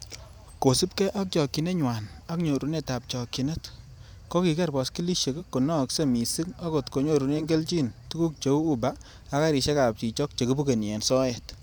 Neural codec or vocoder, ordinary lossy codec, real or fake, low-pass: vocoder, 44.1 kHz, 128 mel bands every 512 samples, BigVGAN v2; none; fake; none